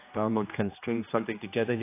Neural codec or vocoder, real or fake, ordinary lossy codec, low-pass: codec, 16 kHz, 1 kbps, X-Codec, HuBERT features, trained on general audio; fake; AAC, 24 kbps; 3.6 kHz